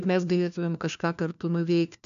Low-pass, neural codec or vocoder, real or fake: 7.2 kHz; codec, 16 kHz, 1 kbps, FunCodec, trained on LibriTTS, 50 frames a second; fake